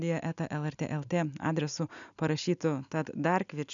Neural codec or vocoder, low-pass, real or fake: none; 7.2 kHz; real